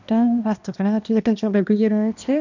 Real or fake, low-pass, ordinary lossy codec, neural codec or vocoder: fake; 7.2 kHz; none; codec, 16 kHz, 1 kbps, X-Codec, HuBERT features, trained on balanced general audio